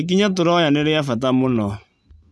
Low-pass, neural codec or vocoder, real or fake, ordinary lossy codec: none; none; real; none